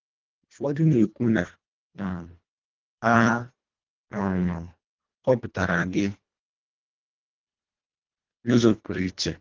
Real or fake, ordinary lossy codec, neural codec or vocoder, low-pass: fake; Opus, 32 kbps; codec, 24 kHz, 1.5 kbps, HILCodec; 7.2 kHz